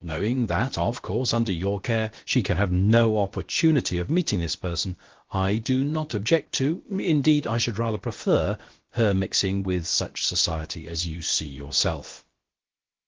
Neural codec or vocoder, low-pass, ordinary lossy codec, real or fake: codec, 16 kHz, about 1 kbps, DyCAST, with the encoder's durations; 7.2 kHz; Opus, 16 kbps; fake